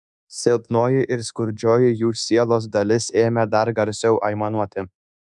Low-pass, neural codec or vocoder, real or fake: 10.8 kHz; codec, 24 kHz, 1.2 kbps, DualCodec; fake